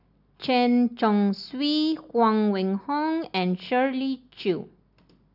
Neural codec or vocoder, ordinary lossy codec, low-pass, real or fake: none; none; 5.4 kHz; real